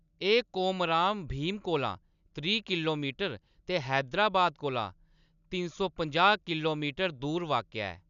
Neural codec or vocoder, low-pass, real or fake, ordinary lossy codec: none; 7.2 kHz; real; none